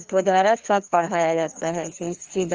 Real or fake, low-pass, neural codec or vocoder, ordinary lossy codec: fake; 7.2 kHz; codec, 16 kHz, 2 kbps, FreqCodec, larger model; Opus, 24 kbps